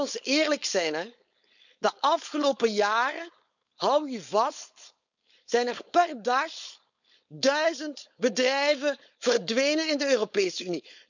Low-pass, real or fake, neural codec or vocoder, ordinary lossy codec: 7.2 kHz; fake; codec, 16 kHz, 4.8 kbps, FACodec; none